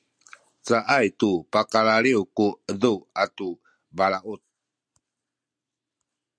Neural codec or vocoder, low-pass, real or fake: none; 9.9 kHz; real